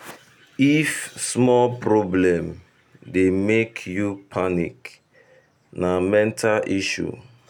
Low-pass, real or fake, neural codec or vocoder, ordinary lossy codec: 19.8 kHz; real; none; none